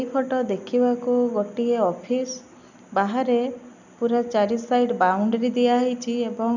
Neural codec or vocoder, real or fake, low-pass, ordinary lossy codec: none; real; 7.2 kHz; none